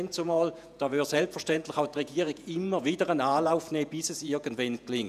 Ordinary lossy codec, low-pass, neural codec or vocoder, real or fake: none; 14.4 kHz; vocoder, 48 kHz, 128 mel bands, Vocos; fake